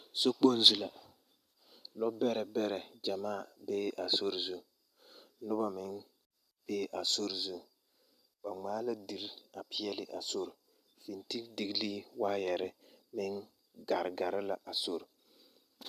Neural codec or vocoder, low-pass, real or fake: none; 14.4 kHz; real